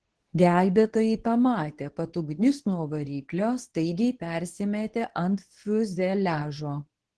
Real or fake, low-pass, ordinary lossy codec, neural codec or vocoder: fake; 10.8 kHz; Opus, 16 kbps; codec, 24 kHz, 0.9 kbps, WavTokenizer, medium speech release version 2